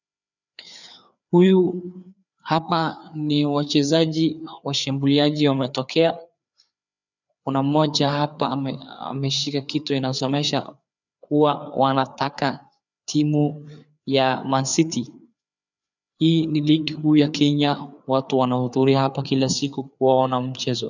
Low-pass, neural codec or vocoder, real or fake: 7.2 kHz; codec, 16 kHz, 4 kbps, FreqCodec, larger model; fake